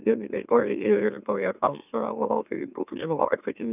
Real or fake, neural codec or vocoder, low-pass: fake; autoencoder, 44.1 kHz, a latent of 192 numbers a frame, MeloTTS; 3.6 kHz